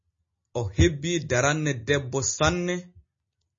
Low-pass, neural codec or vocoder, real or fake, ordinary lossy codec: 7.2 kHz; none; real; MP3, 32 kbps